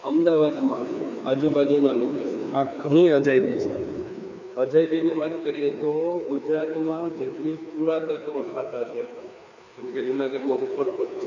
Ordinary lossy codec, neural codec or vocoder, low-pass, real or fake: none; codec, 16 kHz, 2 kbps, FreqCodec, larger model; 7.2 kHz; fake